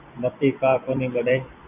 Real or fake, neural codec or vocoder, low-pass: fake; vocoder, 44.1 kHz, 128 mel bands every 256 samples, BigVGAN v2; 3.6 kHz